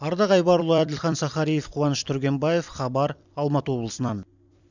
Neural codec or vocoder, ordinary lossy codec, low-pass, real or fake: vocoder, 44.1 kHz, 128 mel bands every 256 samples, BigVGAN v2; none; 7.2 kHz; fake